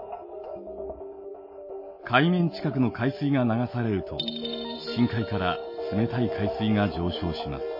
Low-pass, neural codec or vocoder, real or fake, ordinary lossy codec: 5.4 kHz; none; real; MP3, 24 kbps